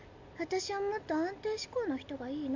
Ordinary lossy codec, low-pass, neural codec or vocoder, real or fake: AAC, 48 kbps; 7.2 kHz; none; real